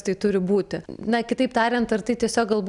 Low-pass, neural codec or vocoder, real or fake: 10.8 kHz; none; real